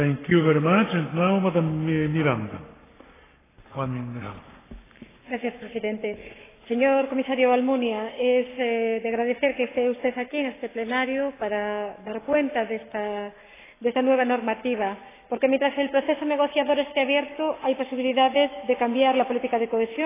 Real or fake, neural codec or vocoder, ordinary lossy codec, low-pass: fake; codec, 44.1 kHz, 7.8 kbps, Pupu-Codec; AAC, 16 kbps; 3.6 kHz